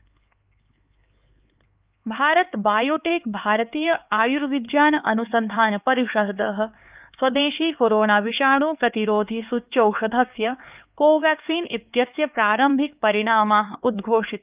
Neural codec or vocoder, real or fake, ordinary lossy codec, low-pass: codec, 16 kHz, 4 kbps, X-Codec, HuBERT features, trained on LibriSpeech; fake; Opus, 24 kbps; 3.6 kHz